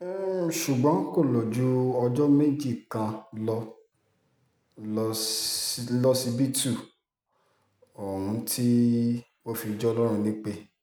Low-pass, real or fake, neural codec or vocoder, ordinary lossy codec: none; real; none; none